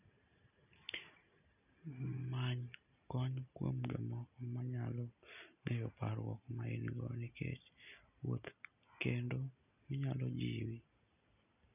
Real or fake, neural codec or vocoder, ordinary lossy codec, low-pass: real; none; none; 3.6 kHz